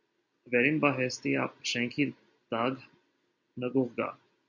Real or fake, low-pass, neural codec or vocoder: real; 7.2 kHz; none